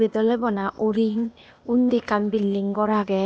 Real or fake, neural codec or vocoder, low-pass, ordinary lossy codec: fake; codec, 16 kHz, 0.8 kbps, ZipCodec; none; none